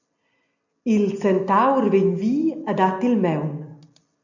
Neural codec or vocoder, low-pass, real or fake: none; 7.2 kHz; real